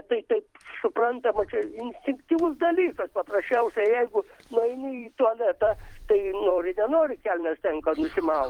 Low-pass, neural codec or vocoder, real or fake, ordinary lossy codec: 19.8 kHz; vocoder, 44.1 kHz, 128 mel bands every 256 samples, BigVGAN v2; fake; Opus, 24 kbps